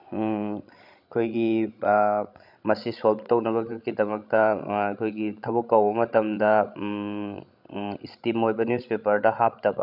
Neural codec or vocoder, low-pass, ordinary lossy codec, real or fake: codec, 16 kHz, 16 kbps, FreqCodec, larger model; 5.4 kHz; none; fake